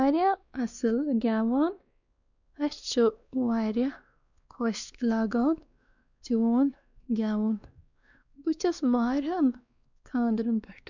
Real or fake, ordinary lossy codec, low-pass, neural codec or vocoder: fake; none; 7.2 kHz; codec, 16 kHz, 2 kbps, X-Codec, WavLM features, trained on Multilingual LibriSpeech